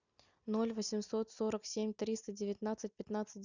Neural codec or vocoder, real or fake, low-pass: none; real; 7.2 kHz